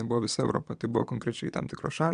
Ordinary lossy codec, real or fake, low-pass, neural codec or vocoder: AAC, 96 kbps; fake; 9.9 kHz; vocoder, 22.05 kHz, 80 mel bands, Vocos